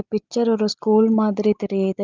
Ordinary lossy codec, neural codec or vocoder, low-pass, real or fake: Opus, 24 kbps; none; 7.2 kHz; real